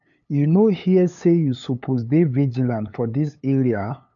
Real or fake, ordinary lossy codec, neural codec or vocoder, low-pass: fake; none; codec, 16 kHz, 8 kbps, FunCodec, trained on LibriTTS, 25 frames a second; 7.2 kHz